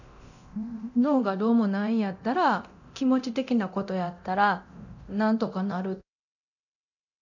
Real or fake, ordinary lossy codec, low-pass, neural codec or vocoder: fake; none; 7.2 kHz; codec, 24 kHz, 0.9 kbps, DualCodec